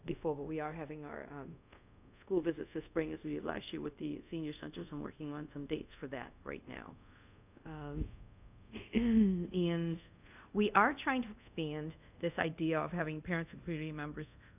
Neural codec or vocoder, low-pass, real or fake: codec, 24 kHz, 0.5 kbps, DualCodec; 3.6 kHz; fake